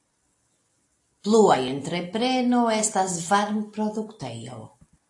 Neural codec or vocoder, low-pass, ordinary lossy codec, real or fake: none; 10.8 kHz; AAC, 48 kbps; real